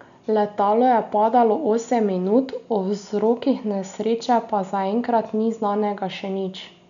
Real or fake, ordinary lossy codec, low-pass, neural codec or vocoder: real; none; 7.2 kHz; none